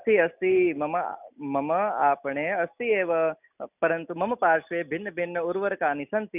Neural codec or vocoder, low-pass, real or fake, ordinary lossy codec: none; 3.6 kHz; real; none